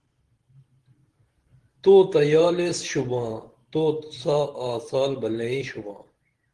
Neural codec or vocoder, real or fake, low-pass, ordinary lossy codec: vocoder, 44.1 kHz, 128 mel bands every 512 samples, BigVGAN v2; fake; 10.8 kHz; Opus, 16 kbps